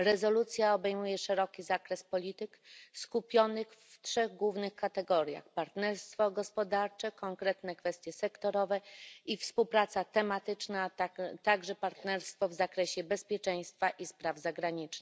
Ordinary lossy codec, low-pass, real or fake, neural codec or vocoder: none; none; real; none